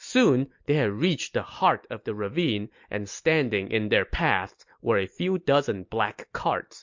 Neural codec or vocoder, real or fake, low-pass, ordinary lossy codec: none; real; 7.2 kHz; MP3, 48 kbps